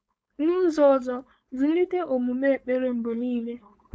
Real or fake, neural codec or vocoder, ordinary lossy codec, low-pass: fake; codec, 16 kHz, 4.8 kbps, FACodec; none; none